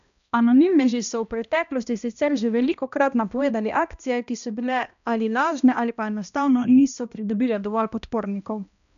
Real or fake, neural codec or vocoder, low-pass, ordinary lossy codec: fake; codec, 16 kHz, 1 kbps, X-Codec, HuBERT features, trained on balanced general audio; 7.2 kHz; none